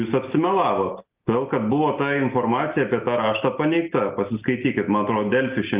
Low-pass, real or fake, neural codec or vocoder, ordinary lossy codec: 3.6 kHz; real; none; Opus, 32 kbps